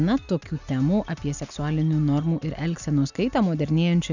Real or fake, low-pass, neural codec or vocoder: real; 7.2 kHz; none